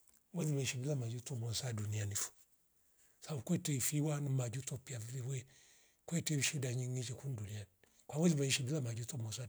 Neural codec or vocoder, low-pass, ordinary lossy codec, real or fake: none; none; none; real